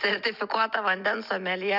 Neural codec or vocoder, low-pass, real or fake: none; 5.4 kHz; real